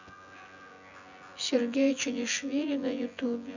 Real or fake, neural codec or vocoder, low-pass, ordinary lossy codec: fake; vocoder, 24 kHz, 100 mel bands, Vocos; 7.2 kHz; none